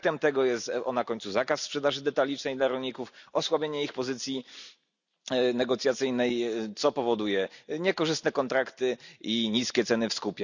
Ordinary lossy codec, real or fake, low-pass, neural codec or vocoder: none; real; 7.2 kHz; none